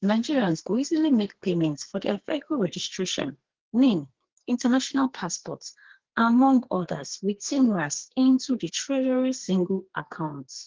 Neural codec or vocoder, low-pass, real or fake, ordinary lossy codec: codec, 32 kHz, 1.9 kbps, SNAC; 7.2 kHz; fake; Opus, 16 kbps